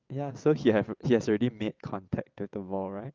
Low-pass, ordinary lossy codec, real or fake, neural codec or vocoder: 7.2 kHz; Opus, 24 kbps; fake; vocoder, 22.05 kHz, 80 mel bands, WaveNeXt